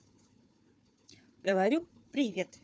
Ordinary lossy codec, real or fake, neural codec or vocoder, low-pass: none; fake; codec, 16 kHz, 4 kbps, FunCodec, trained on Chinese and English, 50 frames a second; none